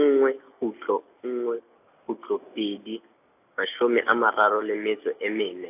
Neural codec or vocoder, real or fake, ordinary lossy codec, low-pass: none; real; AAC, 32 kbps; 3.6 kHz